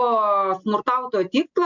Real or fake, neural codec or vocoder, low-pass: real; none; 7.2 kHz